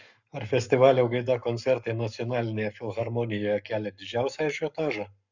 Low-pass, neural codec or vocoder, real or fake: 7.2 kHz; codec, 44.1 kHz, 7.8 kbps, Pupu-Codec; fake